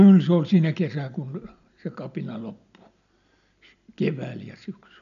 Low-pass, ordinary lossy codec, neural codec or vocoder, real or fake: 7.2 kHz; none; none; real